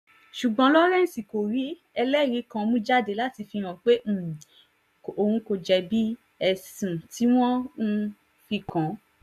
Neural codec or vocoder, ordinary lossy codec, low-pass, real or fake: none; none; 14.4 kHz; real